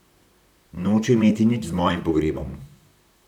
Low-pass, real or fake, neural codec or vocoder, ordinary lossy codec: 19.8 kHz; fake; vocoder, 44.1 kHz, 128 mel bands, Pupu-Vocoder; none